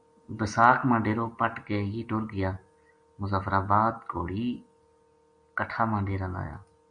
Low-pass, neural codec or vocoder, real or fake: 9.9 kHz; none; real